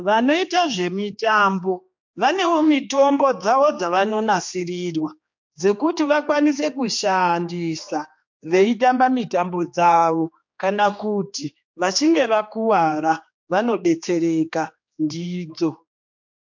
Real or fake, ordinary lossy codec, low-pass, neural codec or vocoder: fake; MP3, 48 kbps; 7.2 kHz; codec, 16 kHz, 2 kbps, X-Codec, HuBERT features, trained on general audio